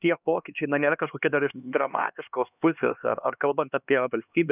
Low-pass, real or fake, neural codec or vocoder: 3.6 kHz; fake; codec, 16 kHz, 2 kbps, X-Codec, HuBERT features, trained on LibriSpeech